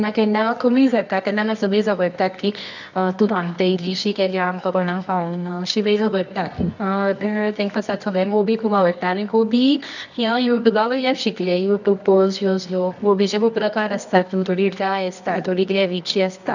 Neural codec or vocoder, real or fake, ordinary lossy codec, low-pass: codec, 24 kHz, 0.9 kbps, WavTokenizer, medium music audio release; fake; none; 7.2 kHz